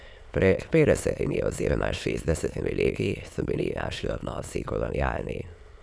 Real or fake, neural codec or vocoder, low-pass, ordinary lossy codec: fake; autoencoder, 22.05 kHz, a latent of 192 numbers a frame, VITS, trained on many speakers; none; none